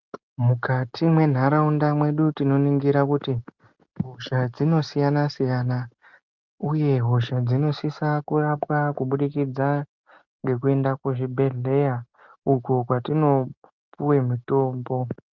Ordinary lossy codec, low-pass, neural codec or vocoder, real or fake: Opus, 24 kbps; 7.2 kHz; none; real